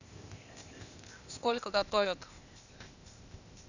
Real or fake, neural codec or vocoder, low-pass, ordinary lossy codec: fake; codec, 16 kHz, 0.8 kbps, ZipCodec; 7.2 kHz; none